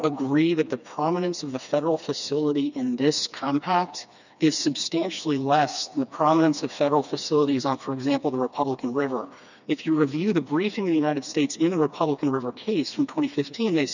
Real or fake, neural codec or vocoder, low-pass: fake; codec, 16 kHz, 2 kbps, FreqCodec, smaller model; 7.2 kHz